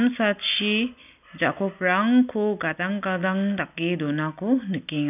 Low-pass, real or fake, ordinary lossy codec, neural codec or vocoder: 3.6 kHz; real; none; none